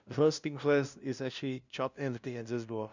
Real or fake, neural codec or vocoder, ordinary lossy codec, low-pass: fake; codec, 16 kHz, 0.5 kbps, FunCodec, trained on LibriTTS, 25 frames a second; none; 7.2 kHz